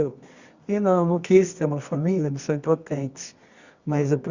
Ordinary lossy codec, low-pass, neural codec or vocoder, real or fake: Opus, 64 kbps; 7.2 kHz; codec, 24 kHz, 0.9 kbps, WavTokenizer, medium music audio release; fake